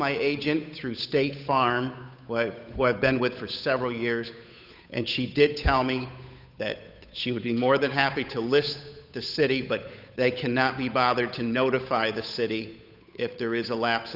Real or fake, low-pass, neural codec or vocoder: fake; 5.4 kHz; codec, 16 kHz, 8 kbps, FunCodec, trained on Chinese and English, 25 frames a second